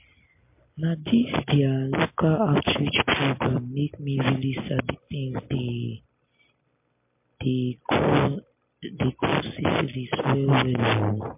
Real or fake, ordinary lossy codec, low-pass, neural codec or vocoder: real; MP3, 32 kbps; 3.6 kHz; none